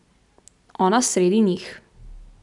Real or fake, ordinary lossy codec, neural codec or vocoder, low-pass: real; none; none; 10.8 kHz